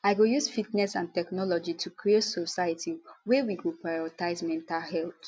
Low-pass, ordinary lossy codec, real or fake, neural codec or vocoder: none; none; real; none